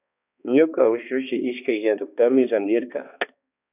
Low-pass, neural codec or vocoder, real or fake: 3.6 kHz; codec, 16 kHz, 2 kbps, X-Codec, HuBERT features, trained on balanced general audio; fake